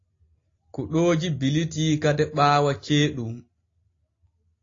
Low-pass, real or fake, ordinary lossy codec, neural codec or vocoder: 7.2 kHz; real; AAC, 32 kbps; none